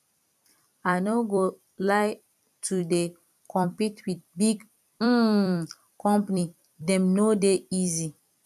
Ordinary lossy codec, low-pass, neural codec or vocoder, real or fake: none; 14.4 kHz; vocoder, 44.1 kHz, 128 mel bands every 256 samples, BigVGAN v2; fake